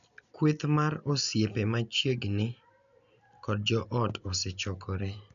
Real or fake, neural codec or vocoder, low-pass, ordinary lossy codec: real; none; 7.2 kHz; none